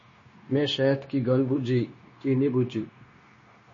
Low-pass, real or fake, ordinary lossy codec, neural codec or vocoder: 7.2 kHz; fake; MP3, 32 kbps; codec, 16 kHz, 0.9 kbps, LongCat-Audio-Codec